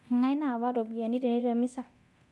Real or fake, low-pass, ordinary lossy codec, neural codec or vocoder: fake; none; none; codec, 24 kHz, 0.9 kbps, DualCodec